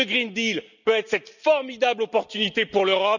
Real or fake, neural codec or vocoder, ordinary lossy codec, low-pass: real; none; none; 7.2 kHz